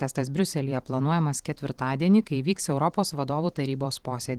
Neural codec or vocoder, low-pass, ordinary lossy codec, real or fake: vocoder, 44.1 kHz, 128 mel bands every 512 samples, BigVGAN v2; 19.8 kHz; Opus, 16 kbps; fake